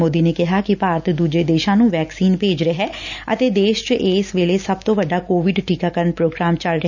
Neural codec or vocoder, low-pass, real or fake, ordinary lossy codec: none; 7.2 kHz; real; none